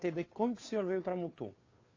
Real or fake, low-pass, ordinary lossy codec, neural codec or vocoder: fake; 7.2 kHz; AAC, 32 kbps; codec, 16 kHz, 2 kbps, FunCodec, trained on Chinese and English, 25 frames a second